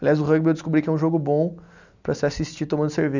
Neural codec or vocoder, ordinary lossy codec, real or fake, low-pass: none; none; real; 7.2 kHz